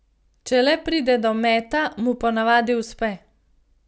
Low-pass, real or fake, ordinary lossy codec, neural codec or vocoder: none; real; none; none